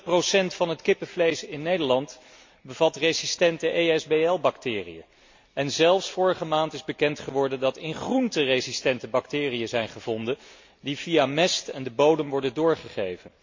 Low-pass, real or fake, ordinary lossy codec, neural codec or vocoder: 7.2 kHz; real; MP3, 48 kbps; none